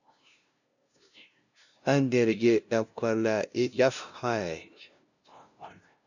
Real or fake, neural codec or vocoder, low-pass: fake; codec, 16 kHz, 0.5 kbps, FunCodec, trained on LibriTTS, 25 frames a second; 7.2 kHz